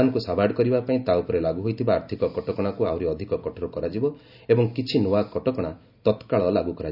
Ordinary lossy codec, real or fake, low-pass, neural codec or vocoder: none; real; 5.4 kHz; none